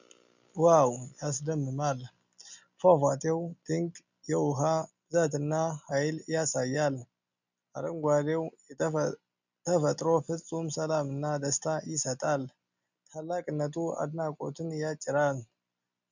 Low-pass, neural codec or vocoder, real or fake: 7.2 kHz; none; real